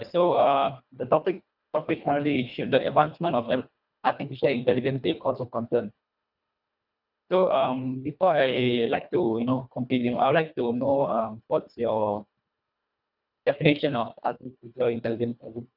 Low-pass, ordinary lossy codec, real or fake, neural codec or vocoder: 5.4 kHz; none; fake; codec, 24 kHz, 1.5 kbps, HILCodec